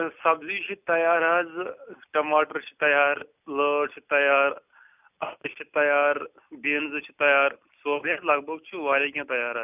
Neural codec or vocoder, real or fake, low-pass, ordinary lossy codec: none; real; 3.6 kHz; none